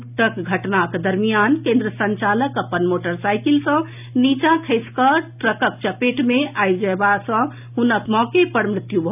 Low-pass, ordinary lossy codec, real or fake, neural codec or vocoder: 3.6 kHz; none; real; none